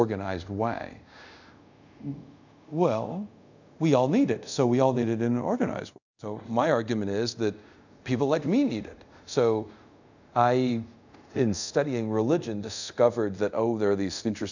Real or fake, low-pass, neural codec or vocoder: fake; 7.2 kHz; codec, 24 kHz, 0.5 kbps, DualCodec